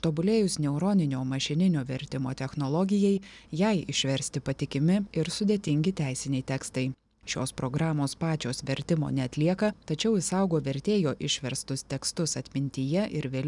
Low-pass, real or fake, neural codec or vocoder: 10.8 kHz; real; none